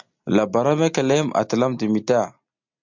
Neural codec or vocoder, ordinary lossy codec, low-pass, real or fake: none; MP3, 64 kbps; 7.2 kHz; real